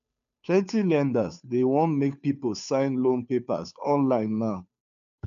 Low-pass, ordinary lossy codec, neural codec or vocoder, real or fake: 7.2 kHz; none; codec, 16 kHz, 2 kbps, FunCodec, trained on Chinese and English, 25 frames a second; fake